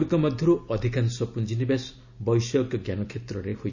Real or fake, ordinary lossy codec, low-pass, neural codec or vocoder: real; none; 7.2 kHz; none